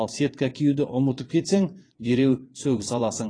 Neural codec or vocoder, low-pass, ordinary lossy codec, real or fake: vocoder, 22.05 kHz, 80 mel bands, WaveNeXt; 9.9 kHz; AAC, 32 kbps; fake